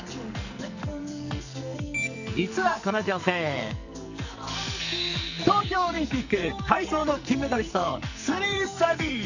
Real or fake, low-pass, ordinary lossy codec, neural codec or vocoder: fake; 7.2 kHz; none; codec, 44.1 kHz, 2.6 kbps, SNAC